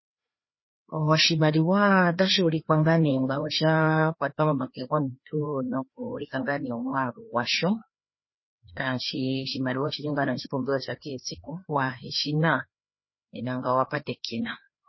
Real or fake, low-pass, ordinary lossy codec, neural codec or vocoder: fake; 7.2 kHz; MP3, 24 kbps; codec, 16 kHz, 2 kbps, FreqCodec, larger model